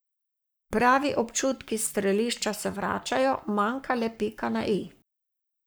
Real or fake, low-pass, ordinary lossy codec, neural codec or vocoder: fake; none; none; codec, 44.1 kHz, 7.8 kbps, Pupu-Codec